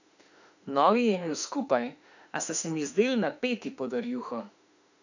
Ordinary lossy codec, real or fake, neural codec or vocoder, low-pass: none; fake; autoencoder, 48 kHz, 32 numbers a frame, DAC-VAE, trained on Japanese speech; 7.2 kHz